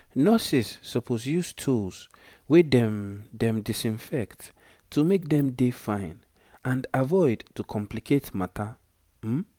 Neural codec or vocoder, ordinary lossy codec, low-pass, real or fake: vocoder, 44.1 kHz, 128 mel bands every 512 samples, BigVGAN v2; none; 19.8 kHz; fake